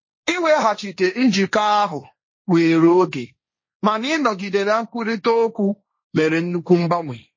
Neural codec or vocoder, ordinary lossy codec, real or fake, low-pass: codec, 16 kHz, 1.1 kbps, Voila-Tokenizer; MP3, 32 kbps; fake; 7.2 kHz